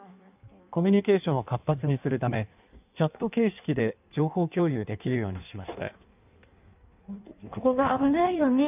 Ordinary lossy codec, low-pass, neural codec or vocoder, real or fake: none; 3.6 kHz; codec, 16 kHz in and 24 kHz out, 1.1 kbps, FireRedTTS-2 codec; fake